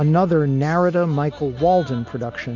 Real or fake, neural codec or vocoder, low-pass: real; none; 7.2 kHz